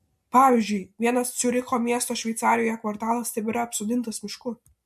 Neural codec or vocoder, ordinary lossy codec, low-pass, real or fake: none; MP3, 64 kbps; 14.4 kHz; real